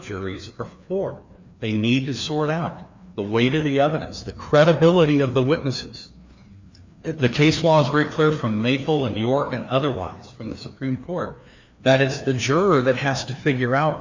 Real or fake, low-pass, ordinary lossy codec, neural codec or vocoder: fake; 7.2 kHz; MP3, 64 kbps; codec, 16 kHz, 2 kbps, FreqCodec, larger model